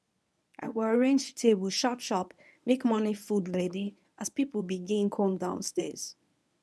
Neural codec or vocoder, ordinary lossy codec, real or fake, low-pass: codec, 24 kHz, 0.9 kbps, WavTokenizer, medium speech release version 1; none; fake; none